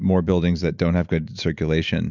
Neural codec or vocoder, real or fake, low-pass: none; real; 7.2 kHz